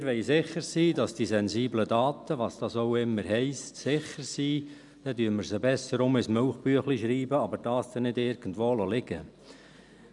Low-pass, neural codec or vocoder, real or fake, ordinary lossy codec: 10.8 kHz; none; real; none